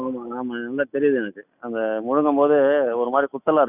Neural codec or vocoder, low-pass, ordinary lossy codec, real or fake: none; 3.6 kHz; none; real